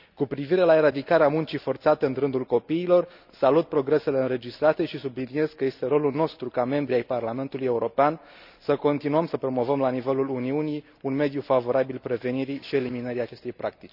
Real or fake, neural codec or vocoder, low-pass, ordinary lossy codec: real; none; 5.4 kHz; none